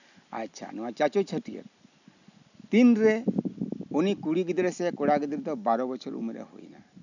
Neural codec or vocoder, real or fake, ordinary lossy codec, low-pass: none; real; none; 7.2 kHz